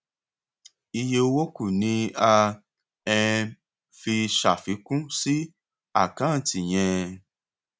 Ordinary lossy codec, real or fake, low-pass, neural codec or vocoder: none; real; none; none